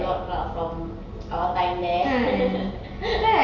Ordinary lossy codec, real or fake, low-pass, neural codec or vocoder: none; real; 7.2 kHz; none